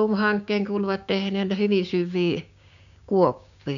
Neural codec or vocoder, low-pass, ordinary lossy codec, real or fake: codec, 16 kHz, 6 kbps, DAC; 7.2 kHz; none; fake